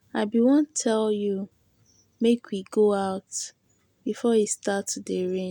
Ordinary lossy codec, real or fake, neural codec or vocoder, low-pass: none; real; none; 19.8 kHz